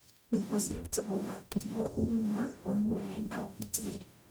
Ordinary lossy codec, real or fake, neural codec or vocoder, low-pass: none; fake; codec, 44.1 kHz, 0.9 kbps, DAC; none